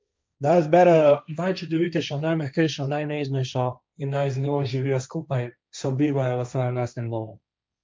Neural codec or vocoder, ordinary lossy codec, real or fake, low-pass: codec, 16 kHz, 1.1 kbps, Voila-Tokenizer; none; fake; none